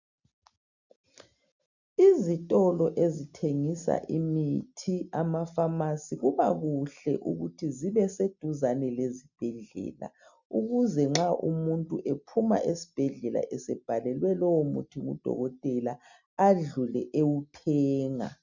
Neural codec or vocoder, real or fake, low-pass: none; real; 7.2 kHz